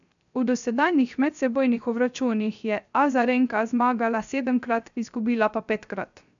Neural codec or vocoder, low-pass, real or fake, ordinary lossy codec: codec, 16 kHz, 0.3 kbps, FocalCodec; 7.2 kHz; fake; none